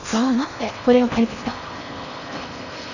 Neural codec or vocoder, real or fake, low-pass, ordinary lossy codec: codec, 16 kHz in and 24 kHz out, 0.6 kbps, FocalCodec, streaming, 4096 codes; fake; 7.2 kHz; none